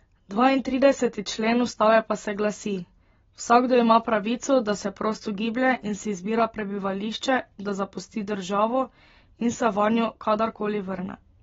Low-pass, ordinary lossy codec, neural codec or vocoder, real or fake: 7.2 kHz; AAC, 24 kbps; none; real